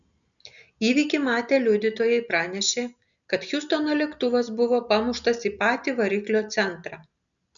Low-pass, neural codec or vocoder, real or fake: 7.2 kHz; none; real